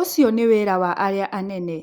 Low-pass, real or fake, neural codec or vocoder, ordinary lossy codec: 19.8 kHz; real; none; Opus, 64 kbps